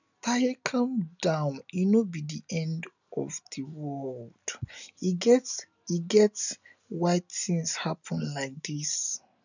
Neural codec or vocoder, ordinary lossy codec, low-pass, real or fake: none; none; 7.2 kHz; real